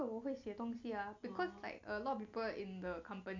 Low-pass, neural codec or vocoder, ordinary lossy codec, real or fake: 7.2 kHz; none; MP3, 64 kbps; real